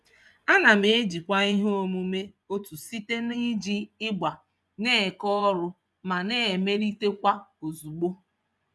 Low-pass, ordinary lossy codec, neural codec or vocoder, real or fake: none; none; vocoder, 24 kHz, 100 mel bands, Vocos; fake